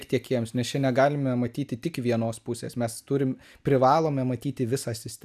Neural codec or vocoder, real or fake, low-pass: none; real; 14.4 kHz